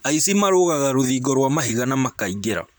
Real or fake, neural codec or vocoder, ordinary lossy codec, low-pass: fake; vocoder, 44.1 kHz, 128 mel bands, Pupu-Vocoder; none; none